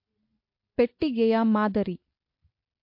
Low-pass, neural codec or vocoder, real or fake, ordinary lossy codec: 5.4 kHz; none; real; MP3, 32 kbps